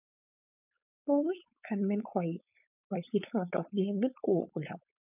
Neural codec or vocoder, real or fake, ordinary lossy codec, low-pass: codec, 16 kHz, 4.8 kbps, FACodec; fake; none; 3.6 kHz